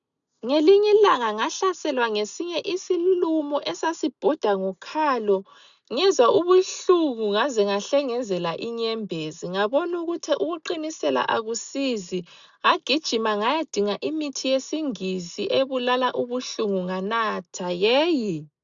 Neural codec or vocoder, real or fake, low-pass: none; real; 7.2 kHz